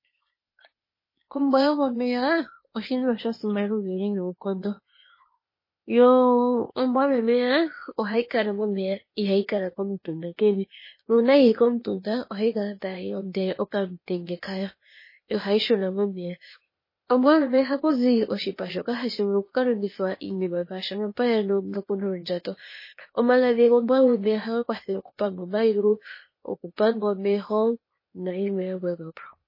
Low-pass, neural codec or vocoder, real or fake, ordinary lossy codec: 5.4 kHz; codec, 16 kHz, 0.8 kbps, ZipCodec; fake; MP3, 24 kbps